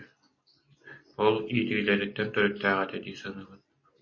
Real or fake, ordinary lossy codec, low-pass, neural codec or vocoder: real; MP3, 32 kbps; 7.2 kHz; none